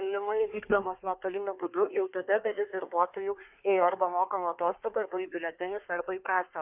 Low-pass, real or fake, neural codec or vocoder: 3.6 kHz; fake; codec, 24 kHz, 1 kbps, SNAC